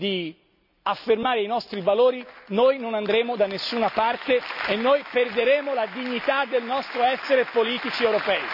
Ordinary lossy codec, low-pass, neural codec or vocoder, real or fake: none; 5.4 kHz; none; real